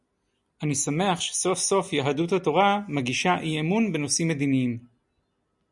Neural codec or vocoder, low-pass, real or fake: none; 10.8 kHz; real